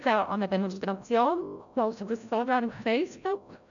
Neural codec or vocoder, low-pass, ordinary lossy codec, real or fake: codec, 16 kHz, 0.5 kbps, FreqCodec, larger model; 7.2 kHz; none; fake